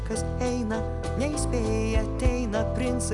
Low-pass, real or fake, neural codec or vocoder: 10.8 kHz; real; none